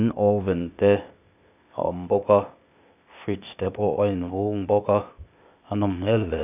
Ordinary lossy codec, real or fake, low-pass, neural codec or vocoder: none; fake; 3.6 kHz; codec, 16 kHz, 0.8 kbps, ZipCodec